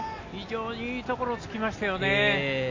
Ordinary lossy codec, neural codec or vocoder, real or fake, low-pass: AAC, 32 kbps; none; real; 7.2 kHz